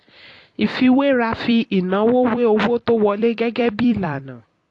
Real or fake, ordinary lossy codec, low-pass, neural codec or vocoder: fake; AAC, 48 kbps; 10.8 kHz; vocoder, 44.1 kHz, 128 mel bands every 256 samples, BigVGAN v2